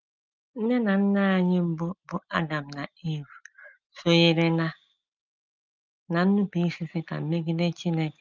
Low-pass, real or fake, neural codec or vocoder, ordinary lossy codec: 7.2 kHz; real; none; Opus, 24 kbps